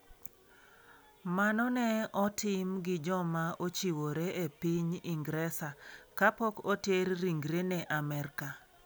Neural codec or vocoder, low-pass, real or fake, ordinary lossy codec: none; none; real; none